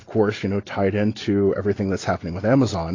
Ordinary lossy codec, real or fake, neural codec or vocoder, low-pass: AAC, 32 kbps; real; none; 7.2 kHz